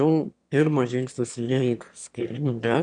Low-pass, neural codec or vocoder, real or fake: 9.9 kHz; autoencoder, 22.05 kHz, a latent of 192 numbers a frame, VITS, trained on one speaker; fake